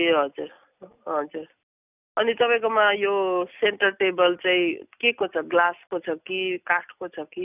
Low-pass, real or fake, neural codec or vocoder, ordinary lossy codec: 3.6 kHz; real; none; none